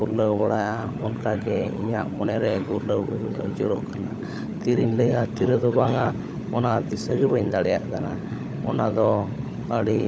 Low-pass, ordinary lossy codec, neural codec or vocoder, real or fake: none; none; codec, 16 kHz, 16 kbps, FunCodec, trained on LibriTTS, 50 frames a second; fake